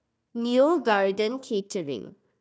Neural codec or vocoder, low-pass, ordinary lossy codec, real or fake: codec, 16 kHz, 1 kbps, FunCodec, trained on Chinese and English, 50 frames a second; none; none; fake